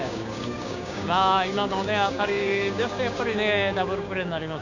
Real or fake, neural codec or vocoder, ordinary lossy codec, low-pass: fake; codec, 16 kHz, 6 kbps, DAC; none; 7.2 kHz